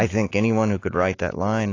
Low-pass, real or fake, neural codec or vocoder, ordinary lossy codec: 7.2 kHz; fake; autoencoder, 48 kHz, 128 numbers a frame, DAC-VAE, trained on Japanese speech; AAC, 32 kbps